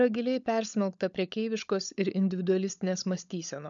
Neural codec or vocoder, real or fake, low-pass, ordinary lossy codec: codec, 16 kHz, 16 kbps, FunCodec, trained on LibriTTS, 50 frames a second; fake; 7.2 kHz; MP3, 96 kbps